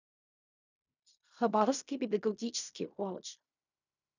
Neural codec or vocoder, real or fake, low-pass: codec, 16 kHz in and 24 kHz out, 0.4 kbps, LongCat-Audio-Codec, fine tuned four codebook decoder; fake; 7.2 kHz